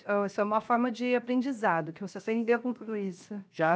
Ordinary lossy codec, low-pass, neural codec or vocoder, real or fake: none; none; codec, 16 kHz, 0.7 kbps, FocalCodec; fake